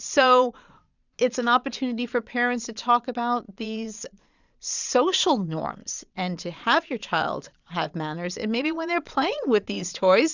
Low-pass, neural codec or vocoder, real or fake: 7.2 kHz; vocoder, 22.05 kHz, 80 mel bands, Vocos; fake